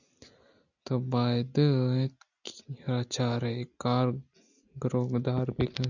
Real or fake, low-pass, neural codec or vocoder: real; 7.2 kHz; none